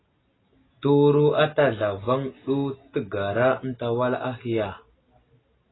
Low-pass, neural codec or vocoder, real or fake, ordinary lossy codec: 7.2 kHz; none; real; AAC, 16 kbps